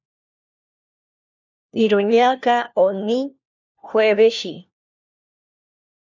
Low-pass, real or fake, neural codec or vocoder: 7.2 kHz; fake; codec, 16 kHz, 1 kbps, FunCodec, trained on LibriTTS, 50 frames a second